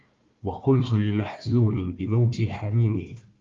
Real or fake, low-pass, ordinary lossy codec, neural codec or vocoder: fake; 7.2 kHz; Opus, 32 kbps; codec, 16 kHz, 1 kbps, FunCodec, trained on Chinese and English, 50 frames a second